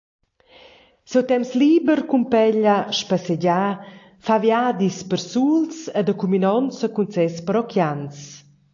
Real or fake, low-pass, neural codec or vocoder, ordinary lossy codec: real; 7.2 kHz; none; AAC, 48 kbps